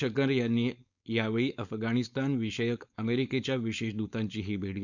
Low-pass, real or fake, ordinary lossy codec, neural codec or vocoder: 7.2 kHz; fake; none; codec, 16 kHz, 4.8 kbps, FACodec